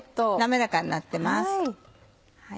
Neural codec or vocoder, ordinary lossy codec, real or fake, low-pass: none; none; real; none